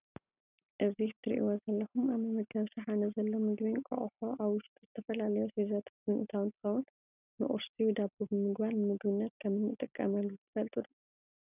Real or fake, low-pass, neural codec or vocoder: real; 3.6 kHz; none